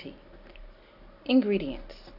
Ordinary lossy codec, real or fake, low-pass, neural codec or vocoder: AAC, 48 kbps; real; 5.4 kHz; none